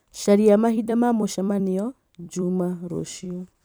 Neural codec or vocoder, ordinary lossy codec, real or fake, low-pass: vocoder, 44.1 kHz, 128 mel bands every 256 samples, BigVGAN v2; none; fake; none